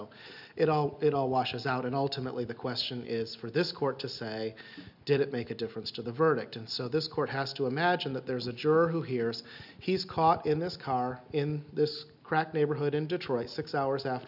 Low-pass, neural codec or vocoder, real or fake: 5.4 kHz; none; real